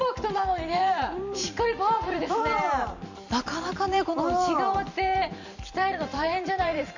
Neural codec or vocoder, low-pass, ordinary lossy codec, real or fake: vocoder, 44.1 kHz, 128 mel bands every 512 samples, BigVGAN v2; 7.2 kHz; none; fake